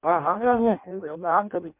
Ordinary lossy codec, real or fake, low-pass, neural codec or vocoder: MP3, 32 kbps; fake; 3.6 kHz; codec, 16 kHz in and 24 kHz out, 0.6 kbps, FireRedTTS-2 codec